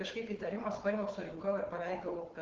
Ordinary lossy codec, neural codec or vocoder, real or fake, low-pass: Opus, 16 kbps; codec, 16 kHz, 4 kbps, FunCodec, trained on Chinese and English, 50 frames a second; fake; 7.2 kHz